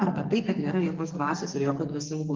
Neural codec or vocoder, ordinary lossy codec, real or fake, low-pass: codec, 44.1 kHz, 2.6 kbps, SNAC; Opus, 32 kbps; fake; 7.2 kHz